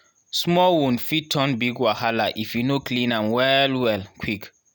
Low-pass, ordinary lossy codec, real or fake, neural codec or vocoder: none; none; real; none